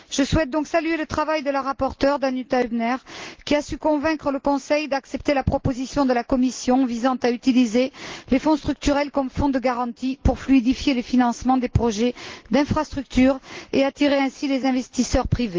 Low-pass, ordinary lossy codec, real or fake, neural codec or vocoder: 7.2 kHz; Opus, 16 kbps; real; none